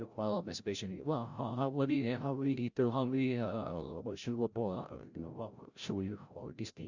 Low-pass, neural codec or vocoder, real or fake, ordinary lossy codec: 7.2 kHz; codec, 16 kHz, 0.5 kbps, FreqCodec, larger model; fake; Opus, 64 kbps